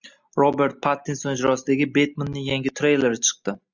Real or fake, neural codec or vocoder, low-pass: real; none; 7.2 kHz